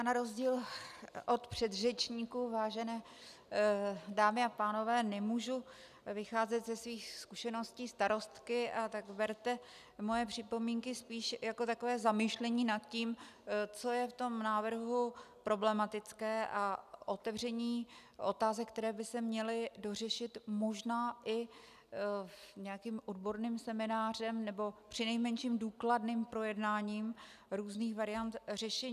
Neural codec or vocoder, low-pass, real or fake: none; 14.4 kHz; real